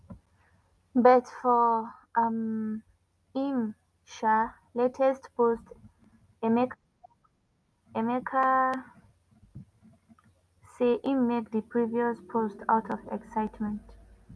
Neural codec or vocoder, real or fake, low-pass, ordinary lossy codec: none; real; none; none